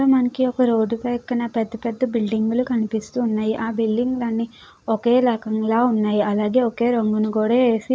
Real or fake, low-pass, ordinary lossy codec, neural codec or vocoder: real; none; none; none